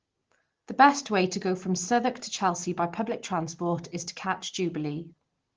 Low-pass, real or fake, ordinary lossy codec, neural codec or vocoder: 7.2 kHz; real; Opus, 16 kbps; none